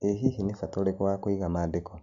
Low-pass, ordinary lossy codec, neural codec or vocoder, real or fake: 7.2 kHz; AAC, 64 kbps; none; real